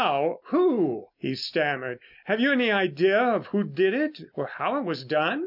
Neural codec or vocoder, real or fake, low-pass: none; real; 5.4 kHz